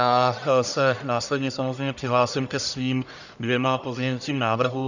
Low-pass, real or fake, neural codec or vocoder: 7.2 kHz; fake; codec, 44.1 kHz, 1.7 kbps, Pupu-Codec